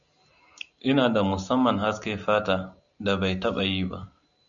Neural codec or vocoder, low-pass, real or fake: none; 7.2 kHz; real